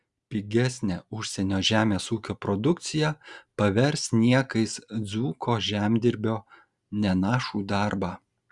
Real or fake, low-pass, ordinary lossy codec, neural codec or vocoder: real; 10.8 kHz; Opus, 64 kbps; none